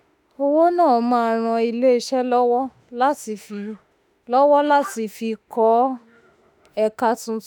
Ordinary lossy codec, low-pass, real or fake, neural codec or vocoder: none; 19.8 kHz; fake; autoencoder, 48 kHz, 32 numbers a frame, DAC-VAE, trained on Japanese speech